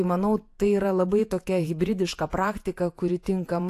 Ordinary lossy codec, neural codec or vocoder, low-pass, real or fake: AAC, 64 kbps; vocoder, 48 kHz, 128 mel bands, Vocos; 14.4 kHz; fake